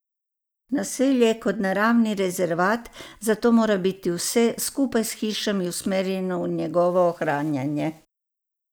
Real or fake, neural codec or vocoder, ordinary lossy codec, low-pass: real; none; none; none